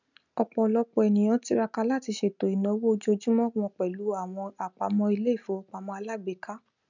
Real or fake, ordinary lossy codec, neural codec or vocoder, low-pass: real; none; none; 7.2 kHz